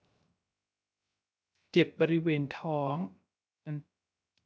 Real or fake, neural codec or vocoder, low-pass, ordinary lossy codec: fake; codec, 16 kHz, 0.3 kbps, FocalCodec; none; none